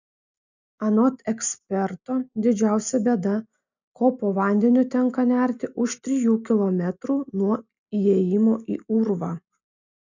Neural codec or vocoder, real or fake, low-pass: none; real; 7.2 kHz